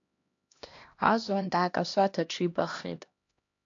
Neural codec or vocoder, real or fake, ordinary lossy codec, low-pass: codec, 16 kHz, 1 kbps, X-Codec, HuBERT features, trained on LibriSpeech; fake; AAC, 48 kbps; 7.2 kHz